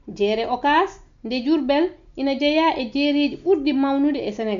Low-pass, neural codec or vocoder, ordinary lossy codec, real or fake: 7.2 kHz; none; MP3, 64 kbps; real